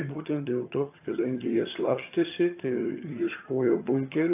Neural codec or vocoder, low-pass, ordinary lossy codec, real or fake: vocoder, 22.05 kHz, 80 mel bands, HiFi-GAN; 3.6 kHz; AAC, 24 kbps; fake